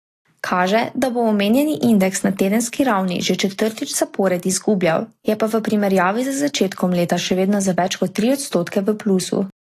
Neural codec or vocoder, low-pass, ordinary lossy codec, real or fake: none; 14.4 kHz; AAC, 48 kbps; real